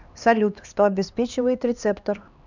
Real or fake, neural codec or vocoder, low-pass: fake; codec, 16 kHz, 2 kbps, X-Codec, HuBERT features, trained on LibriSpeech; 7.2 kHz